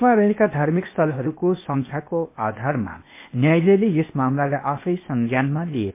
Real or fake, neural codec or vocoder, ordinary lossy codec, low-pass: fake; codec, 16 kHz, 0.8 kbps, ZipCodec; MP3, 24 kbps; 3.6 kHz